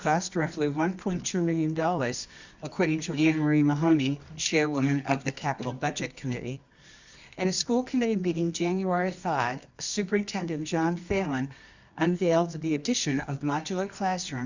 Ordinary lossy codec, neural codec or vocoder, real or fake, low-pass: Opus, 64 kbps; codec, 24 kHz, 0.9 kbps, WavTokenizer, medium music audio release; fake; 7.2 kHz